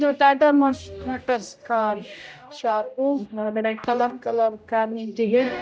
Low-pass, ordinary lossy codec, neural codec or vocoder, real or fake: none; none; codec, 16 kHz, 0.5 kbps, X-Codec, HuBERT features, trained on general audio; fake